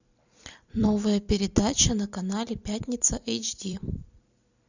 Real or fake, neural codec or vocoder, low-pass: fake; vocoder, 44.1 kHz, 128 mel bands every 256 samples, BigVGAN v2; 7.2 kHz